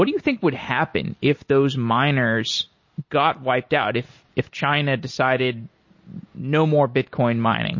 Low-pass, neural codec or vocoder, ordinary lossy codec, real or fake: 7.2 kHz; none; MP3, 32 kbps; real